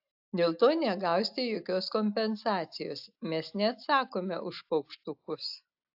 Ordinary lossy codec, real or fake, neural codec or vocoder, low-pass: AAC, 48 kbps; real; none; 5.4 kHz